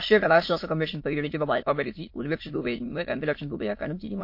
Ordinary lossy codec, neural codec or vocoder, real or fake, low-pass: MP3, 32 kbps; autoencoder, 22.05 kHz, a latent of 192 numbers a frame, VITS, trained on many speakers; fake; 5.4 kHz